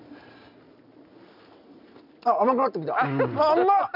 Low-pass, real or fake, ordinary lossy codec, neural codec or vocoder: 5.4 kHz; real; none; none